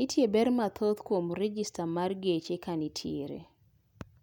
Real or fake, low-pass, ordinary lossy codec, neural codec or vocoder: real; 19.8 kHz; none; none